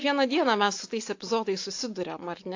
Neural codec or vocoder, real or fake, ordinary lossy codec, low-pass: none; real; AAC, 48 kbps; 7.2 kHz